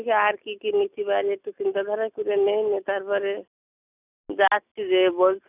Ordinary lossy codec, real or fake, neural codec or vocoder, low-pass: none; real; none; 3.6 kHz